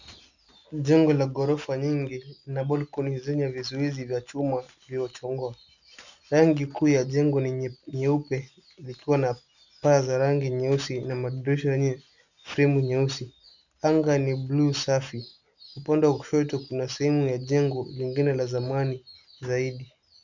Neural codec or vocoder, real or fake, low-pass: none; real; 7.2 kHz